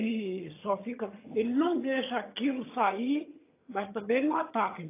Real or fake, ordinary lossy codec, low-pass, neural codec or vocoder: fake; AAC, 24 kbps; 3.6 kHz; vocoder, 22.05 kHz, 80 mel bands, HiFi-GAN